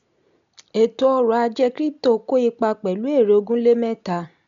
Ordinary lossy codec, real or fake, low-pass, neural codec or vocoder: none; real; 7.2 kHz; none